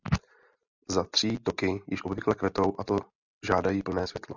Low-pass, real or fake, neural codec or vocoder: 7.2 kHz; real; none